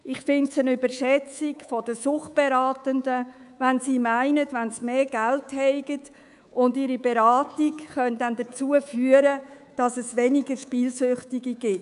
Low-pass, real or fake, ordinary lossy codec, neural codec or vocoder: 10.8 kHz; fake; none; codec, 24 kHz, 3.1 kbps, DualCodec